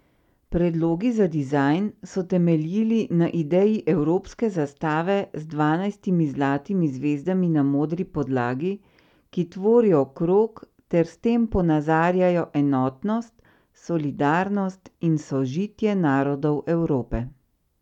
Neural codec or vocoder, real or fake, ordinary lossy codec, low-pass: none; real; none; 19.8 kHz